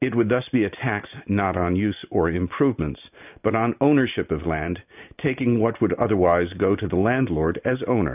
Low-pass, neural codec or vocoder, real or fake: 3.6 kHz; none; real